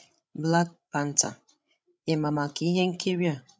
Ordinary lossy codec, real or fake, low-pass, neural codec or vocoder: none; fake; none; codec, 16 kHz, 16 kbps, FreqCodec, larger model